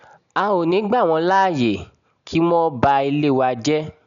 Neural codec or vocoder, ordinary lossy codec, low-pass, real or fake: none; none; 7.2 kHz; real